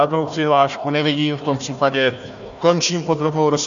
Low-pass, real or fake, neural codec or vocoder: 7.2 kHz; fake; codec, 16 kHz, 1 kbps, FunCodec, trained on Chinese and English, 50 frames a second